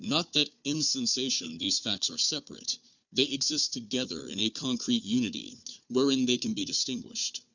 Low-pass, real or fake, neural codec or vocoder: 7.2 kHz; fake; codec, 16 kHz, 4 kbps, FunCodec, trained on Chinese and English, 50 frames a second